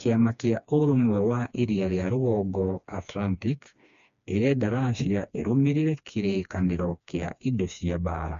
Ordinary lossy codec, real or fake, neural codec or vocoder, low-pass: MP3, 64 kbps; fake; codec, 16 kHz, 2 kbps, FreqCodec, smaller model; 7.2 kHz